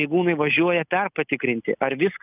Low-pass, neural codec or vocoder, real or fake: 3.6 kHz; none; real